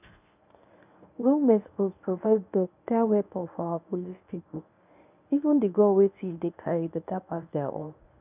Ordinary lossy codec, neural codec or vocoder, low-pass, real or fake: none; codec, 24 kHz, 0.9 kbps, WavTokenizer, medium speech release version 1; 3.6 kHz; fake